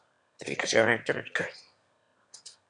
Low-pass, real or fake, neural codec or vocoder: 9.9 kHz; fake; autoencoder, 22.05 kHz, a latent of 192 numbers a frame, VITS, trained on one speaker